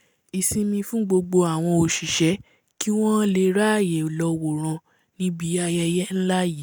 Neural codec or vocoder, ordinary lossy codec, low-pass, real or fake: none; none; none; real